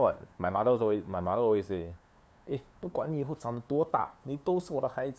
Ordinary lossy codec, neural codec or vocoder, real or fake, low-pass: none; codec, 16 kHz, 2 kbps, FunCodec, trained on LibriTTS, 25 frames a second; fake; none